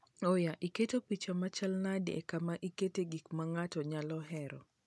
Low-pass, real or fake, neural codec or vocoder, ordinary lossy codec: none; real; none; none